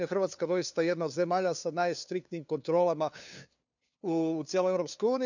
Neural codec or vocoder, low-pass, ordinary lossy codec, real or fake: codec, 16 kHz, 2 kbps, FunCodec, trained on LibriTTS, 25 frames a second; 7.2 kHz; none; fake